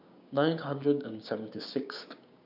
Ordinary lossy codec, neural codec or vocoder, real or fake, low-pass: none; codec, 16 kHz, 6 kbps, DAC; fake; 5.4 kHz